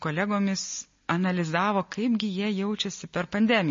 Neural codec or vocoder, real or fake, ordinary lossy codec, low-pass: none; real; MP3, 32 kbps; 7.2 kHz